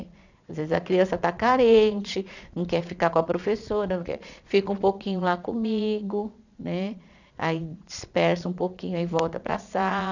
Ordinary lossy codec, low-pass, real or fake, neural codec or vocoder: none; 7.2 kHz; fake; vocoder, 22.05 kHz, 80 mel bands, Vocos